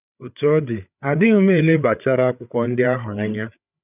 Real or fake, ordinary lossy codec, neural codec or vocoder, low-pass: fake; none; codec, 16 kHz, 8 kbps, FreqCodec, larger model; 3.6 kHz